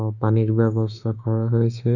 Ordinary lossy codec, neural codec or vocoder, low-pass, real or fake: Opus, 64 kbps; autoencoder, 48 kHz, 32 numbers a frame, DAC-VAE, trained on Japanese speech; 7.2 kHz; fake